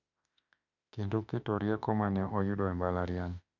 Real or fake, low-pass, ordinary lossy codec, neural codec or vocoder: fake; 7.2 kHz; none; autoencoder, 48 kHz, 32 numbers a frame, DAC-VAE, trained on Japanese speech